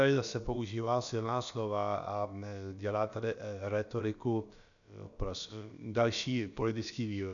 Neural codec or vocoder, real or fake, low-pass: codec, 16 kHz, about 1 kbps, DyCAST, with the encoder's durations; fake; 7.2 kHz